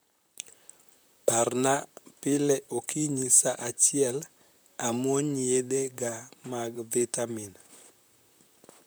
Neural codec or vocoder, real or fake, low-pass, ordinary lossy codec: vocoder, 44.1 kHz, 128 mel bands, Pupu-Vocoder; fake; none; none